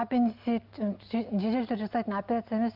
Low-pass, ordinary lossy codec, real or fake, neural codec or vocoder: 5.4 kHz; Opus, 32 kbps; real; none